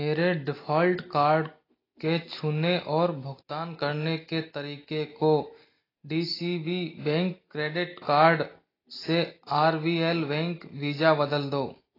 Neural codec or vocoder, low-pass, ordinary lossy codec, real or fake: none; 5.4 kHz; AAC, 24 kbps; real